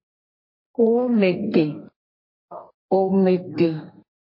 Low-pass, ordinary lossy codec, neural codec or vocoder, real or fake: 5.4 kHz; MP3, 24 kbps; codec, 32 kHz, 1.9 kbps, SNAC; fake